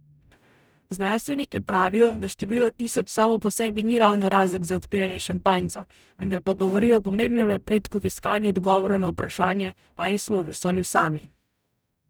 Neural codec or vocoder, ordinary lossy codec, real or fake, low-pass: codec, 44.1 kHz, 0.9 kbps, DAC; none; fake; none